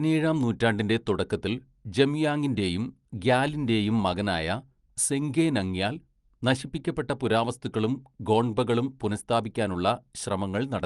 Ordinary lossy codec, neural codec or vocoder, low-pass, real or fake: Opus, 32 kbps; none; 10.8 kHz; real